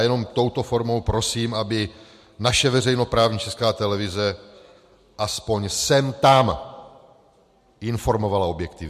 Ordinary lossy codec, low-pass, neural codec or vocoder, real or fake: MP3, 64 kbps; 14.4 kHz; none; real